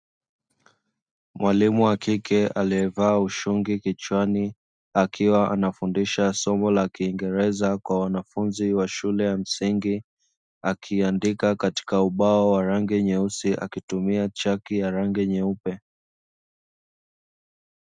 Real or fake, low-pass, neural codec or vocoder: real; 9.9 kHz; none